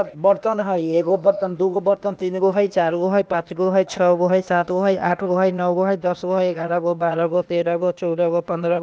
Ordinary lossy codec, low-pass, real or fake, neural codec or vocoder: none; none; fake; codec, 16 kHz, 0.8 kbps, ZipCodec